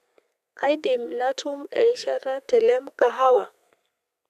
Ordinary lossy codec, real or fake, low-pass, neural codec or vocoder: none; fake; 14.4 kHz; codec, 32 kHz, 1.9 kbps, SNAC